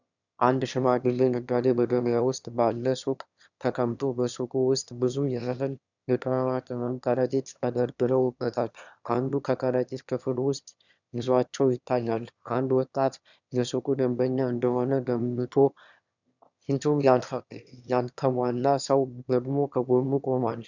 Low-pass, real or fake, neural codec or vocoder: 7.2 kHz; fake; autoencoder, 22.05 kHz, a latent of 192 numbers a frame, VITS, trained on one speaker